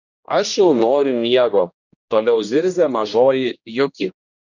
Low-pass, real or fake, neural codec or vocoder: 7.2 kHz; fake; codec, 16 kHz, 1 kbps, X-Codec, HuBERT features, trained on general audio